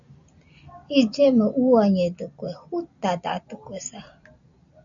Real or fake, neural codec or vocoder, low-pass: real; none; 7.2 kHz